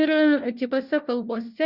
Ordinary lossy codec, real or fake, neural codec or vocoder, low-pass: Opus, 64 kbps; fake; codec, 16 kHz, 1 kbps, FunCodec, trained on LibriTTS, 50 frames a second; 5.4 kHz